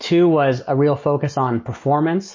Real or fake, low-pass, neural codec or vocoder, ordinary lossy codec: real; 7.2 kHz; none; MP3, 32 kbps